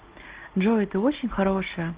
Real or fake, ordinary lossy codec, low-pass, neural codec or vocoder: real; Opus, 16 kbps; 3.6 kHz; none